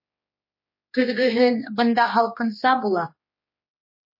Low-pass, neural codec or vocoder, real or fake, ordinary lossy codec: 5.4 kHz; codec, 16 kHz, 2 kbps, X-Codec, HuBERT features, trained on general audio; fake; MP3, 24 kbps